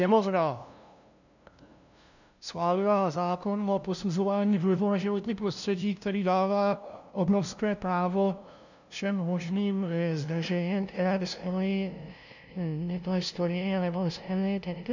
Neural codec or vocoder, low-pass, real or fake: codec, 16 kHz, 0.5 kbps, FunCodec, trained on LibriTTS, 25 frames a second; 7.2 kHz; fake